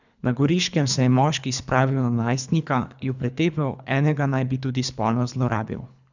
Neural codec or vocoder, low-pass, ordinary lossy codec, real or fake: codec, 24 kHz, 3 kbps, HILCodec; 7.2 kHz; none; fake